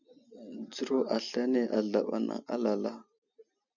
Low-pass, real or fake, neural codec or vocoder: 7.2 kHz; real; none